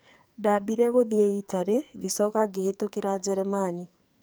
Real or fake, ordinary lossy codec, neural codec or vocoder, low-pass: fake; none; codec, 44.1 kHz, 2.6 kbps, SNAC; none